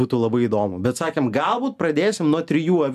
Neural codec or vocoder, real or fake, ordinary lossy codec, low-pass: none; real; AAC, 96 kbps; 14.4 kHz